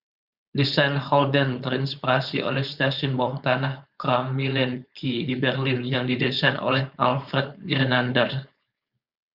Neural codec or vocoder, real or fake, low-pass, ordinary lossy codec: codec, 16 kHz, 4.8 kbps, FACodec; fake; 5.4 kHz; Opus, 64 kbps